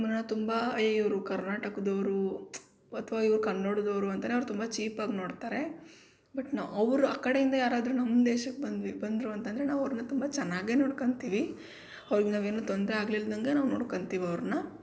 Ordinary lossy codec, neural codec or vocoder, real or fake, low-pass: none; none; real; none